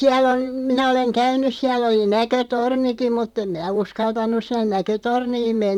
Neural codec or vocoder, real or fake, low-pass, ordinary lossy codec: vocoder, 44.1 kHz, 128 mel bands, Pupu-Vocoder; fake; 19.8 kHz; none